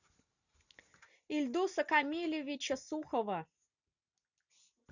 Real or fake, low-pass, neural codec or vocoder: real; 7.2 kHz; none